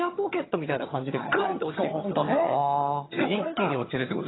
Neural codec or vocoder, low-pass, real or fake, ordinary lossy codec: vocoder, 22.05 kHz, 80 mel bands, HiFi-GAN; 7.2 kHz; fake; AAC, 16 kbps